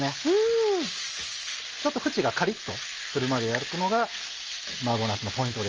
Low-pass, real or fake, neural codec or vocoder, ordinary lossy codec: 7.2 kHz; real; none; Opus, 24 kbps